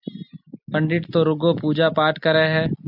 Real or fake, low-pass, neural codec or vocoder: real; 5.4 kHz; none